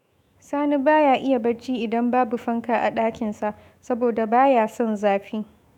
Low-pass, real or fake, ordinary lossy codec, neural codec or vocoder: 19.8 kHz; fake; none; autoencoder, 48 kHz, 128 numbers a frame, DAC-VAE, trained on Japanese speech